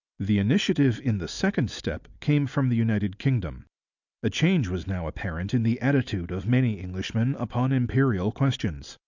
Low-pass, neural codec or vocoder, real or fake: 7.2 kHz; none; real